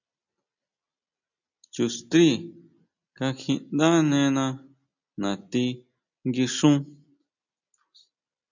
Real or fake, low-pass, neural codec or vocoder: real; 7.2 kHz; none